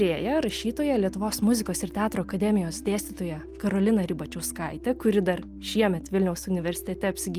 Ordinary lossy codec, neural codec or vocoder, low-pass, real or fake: Opus, 32 kbps; none; 14.4 kHz; real